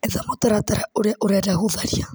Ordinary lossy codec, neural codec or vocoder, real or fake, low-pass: none; none; real; none